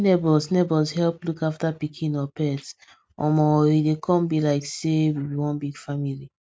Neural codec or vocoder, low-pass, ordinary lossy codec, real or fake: none; none; none; real